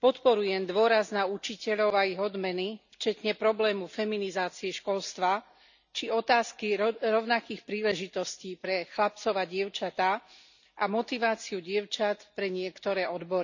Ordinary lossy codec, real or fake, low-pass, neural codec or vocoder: none; real; 7.2 kHz; none